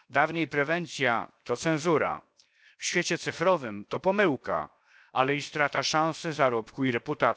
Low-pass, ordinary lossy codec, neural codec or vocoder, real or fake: none; none; codec, 16 kHz, 0.7 kbps, FocalCodec; fake